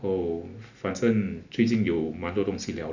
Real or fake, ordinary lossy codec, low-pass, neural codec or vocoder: real; none; 7.2 kHz; none